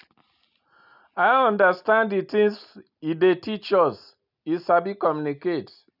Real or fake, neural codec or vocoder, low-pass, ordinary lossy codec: real; none; 5.4 kHz; none